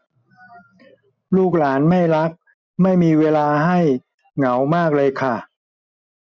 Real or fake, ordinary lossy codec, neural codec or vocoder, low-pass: real; none; none; none